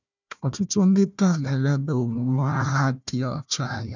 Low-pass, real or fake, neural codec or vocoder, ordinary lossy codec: 7.2 kHz; fake; codec, 16 kHz, 1 kbps, FunCodec, trained on Chinese and English, 50 frames a second; none